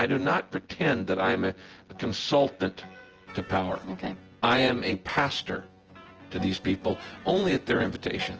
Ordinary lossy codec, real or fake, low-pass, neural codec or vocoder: Opus, 16 kbps; fake; 7.2 kHz; vocoder, 24 kHz, 100 mel bands, Vocos